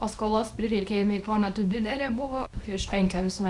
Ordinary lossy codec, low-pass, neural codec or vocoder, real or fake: Opus, 64 kbps; 10.8 kHz; codec, 24 kHz, 0.9 kbps, WavTokenizer, small release; fake